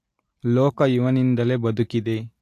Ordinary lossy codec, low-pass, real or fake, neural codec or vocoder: AAC, 64 kbps; 14.4 kHz; real; none